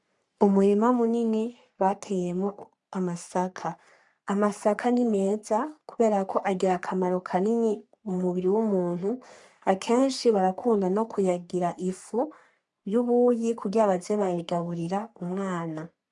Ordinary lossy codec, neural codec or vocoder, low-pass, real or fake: MP3, 96 kbps; codec, 44.1 kHz, 3.4 kbps, Pupu-Codec; 10.8 kHz; fake